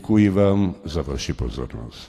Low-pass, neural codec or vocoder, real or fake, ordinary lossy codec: 14.4 kHz; none; real; AAC, 48 kbps